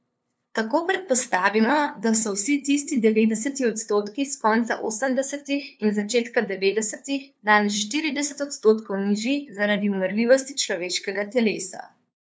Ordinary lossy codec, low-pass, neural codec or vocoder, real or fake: none; none; codec, 16 kHz, 2 kbps, FunCodec, trained on LibriTTS, 25 frames a second; fake